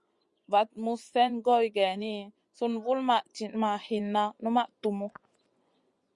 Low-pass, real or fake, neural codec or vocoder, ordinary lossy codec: 10.8 kHz; fake; vocoder, 24 kHz, 100 mel bands, Vocos; Opus, 64 kbps